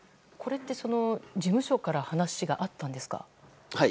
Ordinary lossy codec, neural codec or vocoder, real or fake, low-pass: none; none; real; none